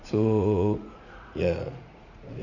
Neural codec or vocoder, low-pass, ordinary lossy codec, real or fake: vocoder, 44.1 kHz, 80 mel bands, Vocos; 7.2 kHz; none; fake